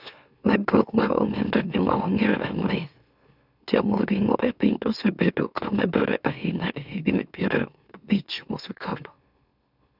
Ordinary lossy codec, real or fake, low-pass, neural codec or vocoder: none; fake; 5.4 kHz; autoencoder, 44.1 kHz, a latent of 192 numbers a frame, MeloTTS